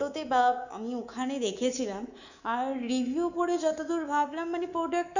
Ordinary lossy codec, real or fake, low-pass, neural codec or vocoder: none; fake; 7.2 kHz; codec, 24 kHz, 3.1 kbps, DualCodec